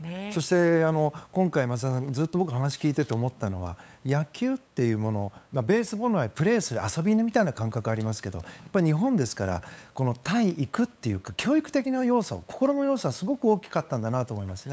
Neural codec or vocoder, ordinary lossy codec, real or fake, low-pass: codec, 16 kHz, 8 kbps, FunCodec, trained on LibriTTS, 25 frames a second; none; fake; none